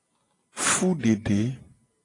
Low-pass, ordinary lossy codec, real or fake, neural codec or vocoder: 10.8 kHz; AAC, 32 kbps; real; none